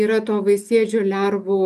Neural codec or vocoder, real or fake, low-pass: none; real; 14.4 kHz